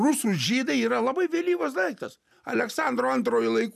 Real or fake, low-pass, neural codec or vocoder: real; 14.4 kHz; none